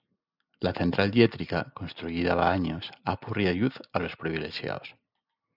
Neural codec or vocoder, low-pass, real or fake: none; 5.4 kHz; real